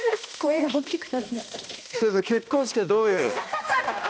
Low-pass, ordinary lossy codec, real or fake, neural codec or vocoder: none; none; fake; codec, 16 kHz, 1 kbps, X-Codec, HuBERT features, trained on balanced general audio